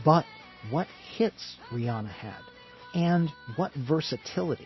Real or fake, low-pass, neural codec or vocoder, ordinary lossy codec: real; 7.2 kHz; none; MP3, 24 kbps